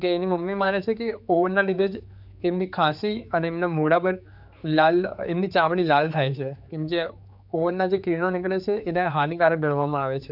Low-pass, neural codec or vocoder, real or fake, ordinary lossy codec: 5.4 kHz; codec, 16 kHz, 4 kbps, X-Codec, HuBERT features, trained on general audio; fake; none